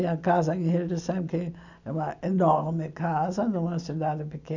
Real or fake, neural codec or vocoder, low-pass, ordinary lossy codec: real; none; 7.2 kHz; none